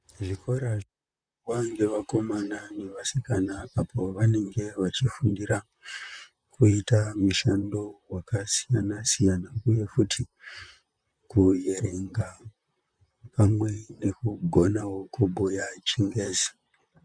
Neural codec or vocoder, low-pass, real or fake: vocoder, 44.1 kHz, 128 mel bands, Pupu-Vocoder; 9.9 kHz; fake